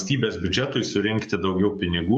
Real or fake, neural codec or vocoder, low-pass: fake; codec, 44.1 kHz, 7.8 kbps, DAC; 10.8 kHz